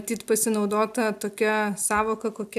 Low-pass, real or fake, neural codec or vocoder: 14.4 kHz; real; none